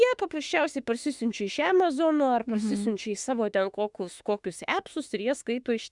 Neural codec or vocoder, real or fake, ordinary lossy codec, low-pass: autoencoder, 48 kHz, 32 numbers a frame, DAC-VAE, trained on Japanese speech; fake; Opus, 64 kbps; 10.8 kHz